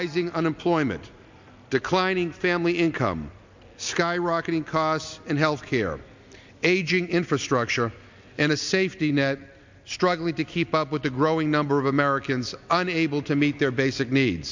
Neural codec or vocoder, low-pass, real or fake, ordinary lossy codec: none; 7.2 kHz; real; MP3, 64 kbps